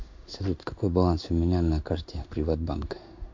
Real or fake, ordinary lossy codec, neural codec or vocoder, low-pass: fake; MP3, 48 kbps; codec, 16 kHz in and 24 kHz out, 1 kbps, XY-Tokenizer; 7.2 kHz